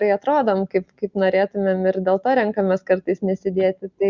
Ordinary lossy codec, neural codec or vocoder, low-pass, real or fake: Opus, 64 kbps; none; 7.2 kHz; real